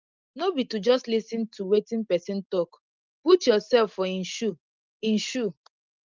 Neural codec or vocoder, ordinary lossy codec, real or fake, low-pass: none; Opus, 32 kbps; real; 7.2 kHz